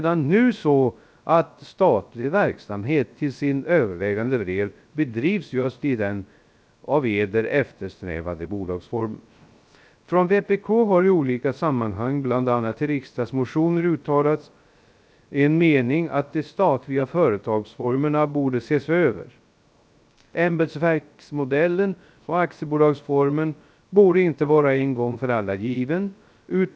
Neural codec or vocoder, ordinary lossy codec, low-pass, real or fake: codec, 16 kHz, 0.3 kbps, FocalCodec; none; none; fake